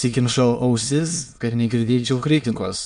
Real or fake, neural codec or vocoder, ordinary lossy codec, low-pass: fake; autoencoder, 22.05 kHz, a latent of 192 numbers a frame, VITS, trained on many speakers; MP3, 64 kbps; 9.9 kHz